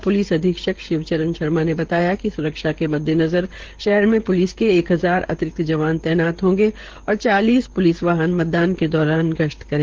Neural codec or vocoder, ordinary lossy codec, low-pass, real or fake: codec, 16 kHz, 16 kbps, FreqCodec, smaller model; Opus, 16 kbps; 7.2 kHz; fake